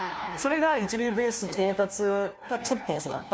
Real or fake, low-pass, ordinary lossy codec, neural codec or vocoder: fake; none; none; codec, 16 kHz, 1 kbps, FunCodec, trained on LibriTTS, 50 frames a second